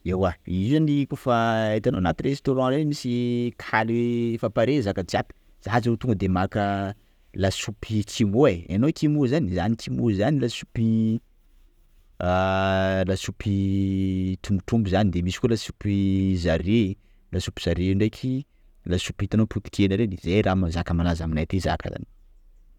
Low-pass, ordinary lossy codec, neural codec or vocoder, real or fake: 19.8 kHz; none; none; real